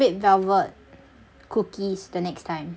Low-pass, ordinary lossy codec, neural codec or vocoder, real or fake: none; none; none; real